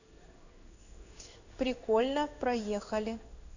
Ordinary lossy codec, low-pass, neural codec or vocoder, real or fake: none; 7.2 kHz; codec, 16 kHz in and 24 kHz out, 1 kbps, XY-Tokenizer; fake